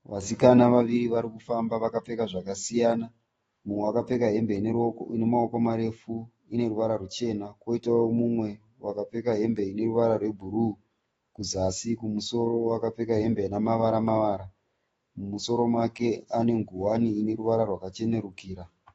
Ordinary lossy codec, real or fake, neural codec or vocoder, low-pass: AAC, 24 kbps; fake; autoencoder, 48 kHz, 128 numbers a frame, DAC-VAE, trained on Japanese speech; 19.8 kHz